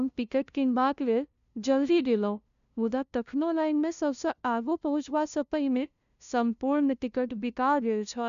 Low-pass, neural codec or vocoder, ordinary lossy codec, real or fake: 7.2 kHz; codec, 16 kHz, 0.5 kbps, FunCodec, trained on LibriTTS, 25 frames a second; none; fake